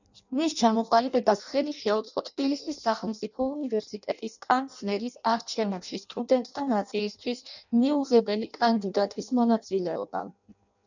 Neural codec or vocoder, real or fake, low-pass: codec, 16 kHz in and 24 kHz out, 0.6 kbps, FireRedTTS-2 codec; fake; 7.2 kHz